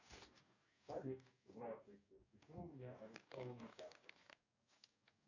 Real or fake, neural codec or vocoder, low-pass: fake; codec, 44.1 kHz, 2.6 kbps, DAC; 7.2 kHz